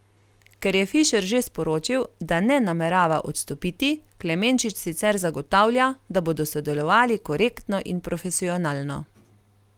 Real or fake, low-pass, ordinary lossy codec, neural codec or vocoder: real; 19.8 kHz; Opus, 32 kbps; none